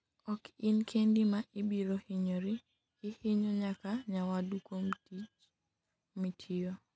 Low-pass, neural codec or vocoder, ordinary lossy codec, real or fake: none; none; none; real